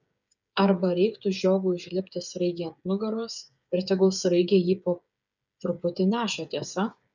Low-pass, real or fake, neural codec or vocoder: 7.2 kHz; fake; codec, 16 kHz, 8 kbps, FreqCodec, smaller model